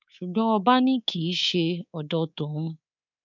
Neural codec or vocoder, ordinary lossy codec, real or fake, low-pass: codec, 24 kHz, 3.1 kbps, DualCodec; none; fake; 7.2 kHz